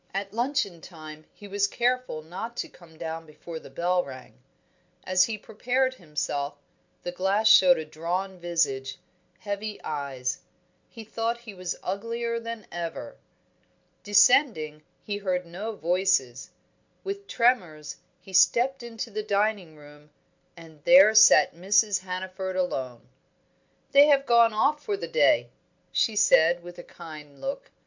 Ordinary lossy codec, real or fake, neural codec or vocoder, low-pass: MP3, 64 kbps; real; none; 7.2 kHz